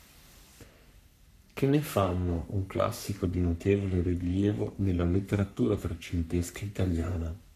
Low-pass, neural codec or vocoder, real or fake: 14.4 kHz; codec, 44.1 kHz, 3.4 kbps, Pupu-Codec; fake